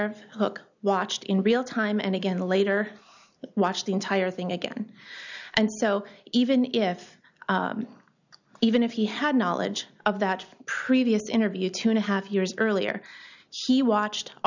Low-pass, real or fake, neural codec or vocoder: 7.2 kHz; real; none